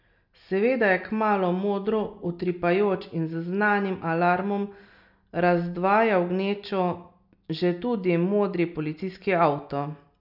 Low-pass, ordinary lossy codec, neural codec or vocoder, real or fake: 5.4 kHz; none; none; real